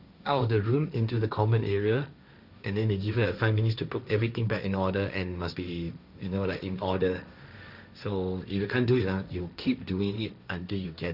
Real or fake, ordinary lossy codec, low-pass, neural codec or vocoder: fake; none; 5.4 kHz; codec, 16 kHz, 1.1 kbps, Voila-Tokenizer